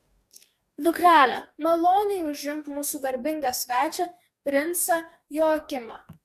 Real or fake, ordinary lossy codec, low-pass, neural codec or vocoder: fake; AAC, 96 kbps; 14.4 kHz; codec, 44.1 kHz, 2.6 kbps, DAC